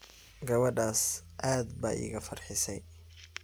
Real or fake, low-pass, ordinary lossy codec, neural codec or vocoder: real; none; none; none